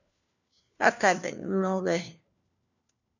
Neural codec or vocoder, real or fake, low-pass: codec, 16 kHz, 1 kbps, FunCodec, trained on LibriTTS, 50 frames a second; fake; 7.2 kHz